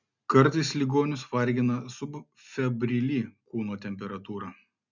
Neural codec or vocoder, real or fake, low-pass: none; real; 7.2 kHz